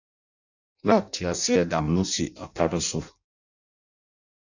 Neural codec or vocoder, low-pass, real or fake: codec, 16 kHz in and 24 kHz out, 0.6 kbps, FireRedTTS-2 codec; 7.2 kHz; fake